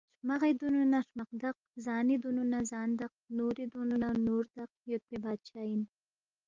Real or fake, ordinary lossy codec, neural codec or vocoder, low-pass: real; Opus, 32 kbps; none; 7.2 kHz